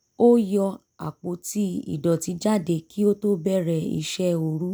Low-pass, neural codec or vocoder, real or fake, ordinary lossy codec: none; none; real; none